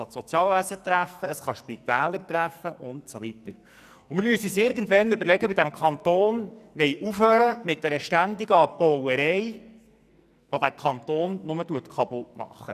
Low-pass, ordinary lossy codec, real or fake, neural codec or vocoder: 14.4 kHz; none; fake; codec, 44.1 kHz, 2.6 kbps, SNAC